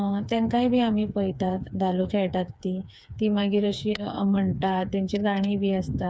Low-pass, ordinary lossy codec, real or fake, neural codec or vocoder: none; none; fake; codec, 16 kHz, 4 kbps, FreqCodec, smaller model